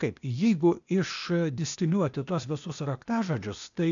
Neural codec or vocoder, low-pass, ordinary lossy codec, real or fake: codec, 16 kHz, 0.8 kbps, ZipCodec; 7.2 kHz; MP3, 96 kbps; fake